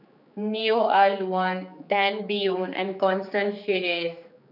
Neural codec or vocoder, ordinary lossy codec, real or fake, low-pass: codec, 16 kHz, 4 kbps, X-Codec, HuBERT features, trained on general audio; none; fake; 5.4 kHz